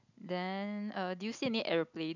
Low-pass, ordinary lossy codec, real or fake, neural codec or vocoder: 7.2 kHz; none; real; none